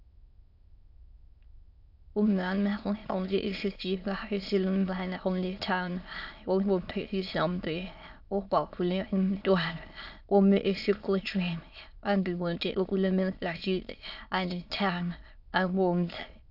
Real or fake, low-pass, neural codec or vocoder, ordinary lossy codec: fake; 5.4 kHz; autoencoder, 22.05 kHz, a latent of 192 numbers a frame, VITS, trained on many speakers; AAC, 48 kbps